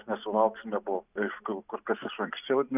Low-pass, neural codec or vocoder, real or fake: 3.6 kHz; none; real